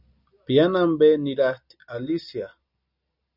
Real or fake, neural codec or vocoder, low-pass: real; none; 5.4 kHz